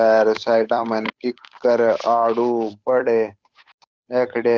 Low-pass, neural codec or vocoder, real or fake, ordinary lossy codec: none; codec, 16 kHz, 8 kbps, FunCodec, trained on Chinese and English, 25 frames a second; fake; none